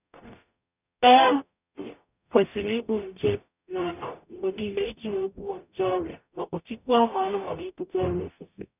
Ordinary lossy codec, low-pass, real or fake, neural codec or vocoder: none; 3.6 kHz; fake; codec, 44.1 kHz, 0.9 kbps, DAC